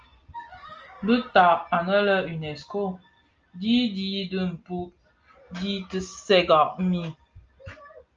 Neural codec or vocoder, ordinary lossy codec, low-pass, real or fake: none; Opus, 24 kbps; 7.2 kHz; real